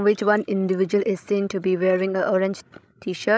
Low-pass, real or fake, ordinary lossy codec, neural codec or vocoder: none; fake; none; codec, 16 kHz, 16 kbps, FreqCodec, larger model